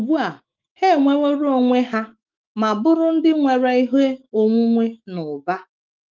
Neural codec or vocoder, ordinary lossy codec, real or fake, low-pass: autoencoder, 48 kHz, 128 numbers a frame, DAC-VAE, trained on Japanese speech; Opus, 32 kbps; fake; 7.2 kHz